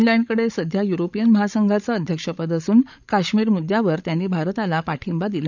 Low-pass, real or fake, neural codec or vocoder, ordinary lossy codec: 7.2 kHz; fake; codec, 16 kHz, 16 kbps, FreqCodec, larger model; none